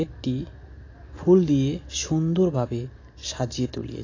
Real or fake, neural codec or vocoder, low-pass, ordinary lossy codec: real; none; 7.2 kHz; AAC, 32 kbps